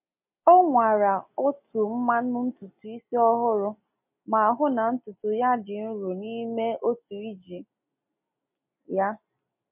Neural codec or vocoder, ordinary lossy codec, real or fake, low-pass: none; MP3, 32 kbps; real; 3.6 kHz